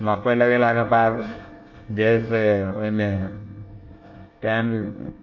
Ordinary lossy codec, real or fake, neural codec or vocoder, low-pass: AAC, 48 kbps; fake; codec, 24 kHz, 1 kbps, SNAC; 7.2 kHz